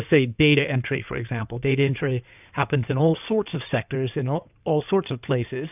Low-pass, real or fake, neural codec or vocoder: 3.6 kHz; fake; codec, 16 kHz in and 24 kHz out, 2.2 kbps, FireRedTTS-2 codec